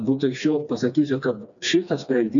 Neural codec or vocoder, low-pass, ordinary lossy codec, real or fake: codec, 16 kHz, 2 kbps, FreqCodec, smaller model; 7.2 kHz; MP3, 96 kbps; fake